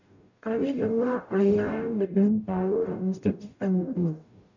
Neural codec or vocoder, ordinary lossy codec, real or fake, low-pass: codec, 44.1 kHz, 0.9 kbps, DAC; none; fake; 7.2 kHz